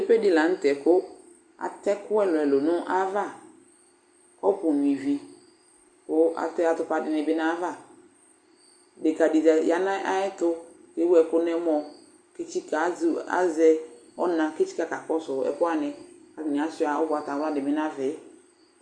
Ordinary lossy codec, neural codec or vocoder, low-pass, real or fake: Opus, 64 kbps; none; 9.9 kHz; real